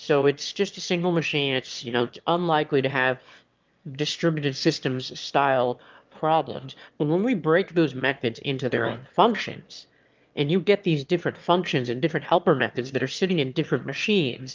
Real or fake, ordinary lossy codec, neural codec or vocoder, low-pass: fake; Opus, 24 kbps; autoencoder, 22.05 kHz, a latent of 192 numbers a frame, VITS, trained on one speaker; 7.2 kHz